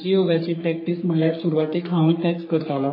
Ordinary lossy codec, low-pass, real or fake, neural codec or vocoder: MP3, 24 kbps; 5.4 kHz; fake; codec, 44.1 kHz, 3.4 kbps, Pupu-Codec